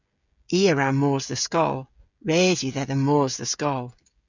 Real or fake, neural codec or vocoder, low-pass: fake; codec, 16 kHz, 16 kbps, FreqCodec, smaller model; 7.2 kHz